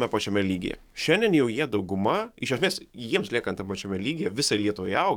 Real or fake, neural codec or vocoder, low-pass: fake; codec, 44.1 kHz, 7.8 kbps, DAC; 19.8 kHz